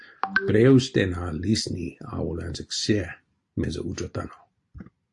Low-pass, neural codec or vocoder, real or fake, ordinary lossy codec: 10.8 kHz; none; real; AAC, 64 kbps